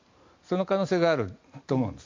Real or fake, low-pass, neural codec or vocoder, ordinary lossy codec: real; 7.2 kHz; none; MP3, 48 kbps